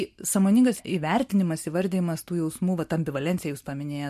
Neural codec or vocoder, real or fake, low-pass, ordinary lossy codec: none; real; 14.4 kHz; MP3, 64 kbps